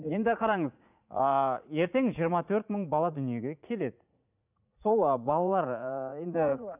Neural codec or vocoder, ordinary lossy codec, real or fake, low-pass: vocoder, 44.1 kHz, 80 mel bands, Vocos; none; fake; 3.6 kHz